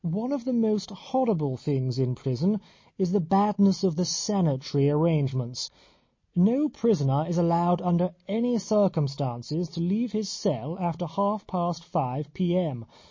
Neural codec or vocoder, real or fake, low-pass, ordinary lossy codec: none; real; 7.2 kHz; MP3, 32 kbps